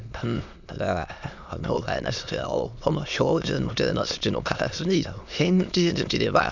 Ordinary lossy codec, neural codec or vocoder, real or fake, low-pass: none; autoencoder, 22.05 kHz, a latent of 192 numbers a frame, VITS, trained on many speakers; fake; 7.2 kHz